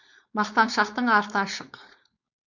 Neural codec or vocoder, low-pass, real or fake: codec, 16 kHz, 4.8 kbps, FACodec; 7.2 kHz; fake